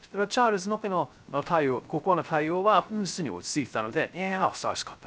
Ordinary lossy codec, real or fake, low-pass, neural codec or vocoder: none; fake; none; codec, 16 kHz, 0.3 kbps, FocalCodec